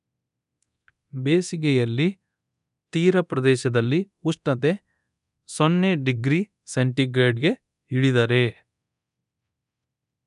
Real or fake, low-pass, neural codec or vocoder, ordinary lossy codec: fake; 10.8 kHz; codec, 24 kHz, 0.9 kbps, DualCodec; none